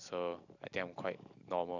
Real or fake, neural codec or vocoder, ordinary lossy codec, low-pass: real; none; none; 7.2 kHz